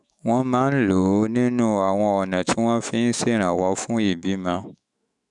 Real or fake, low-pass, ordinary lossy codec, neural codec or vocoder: fake; 10.8 kHz; none; autoencoder, 48 kHz, 128 numbers a frame, DAC-VAE, trained on Japanese speech